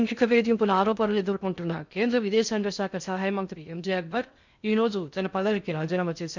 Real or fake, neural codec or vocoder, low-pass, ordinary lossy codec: fake; codec, 16 kHz in and 24 kHz out, 0.6 kbps, FocalCodec, streaming, 2048 codes; 7.2 kHz; none